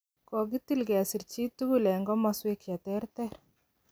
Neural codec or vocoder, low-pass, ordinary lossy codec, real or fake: none; none; none; real